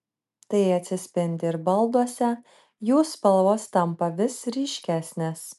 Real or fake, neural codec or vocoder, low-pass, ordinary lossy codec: real; none; 14.4 kHz; MP3, 96 kbps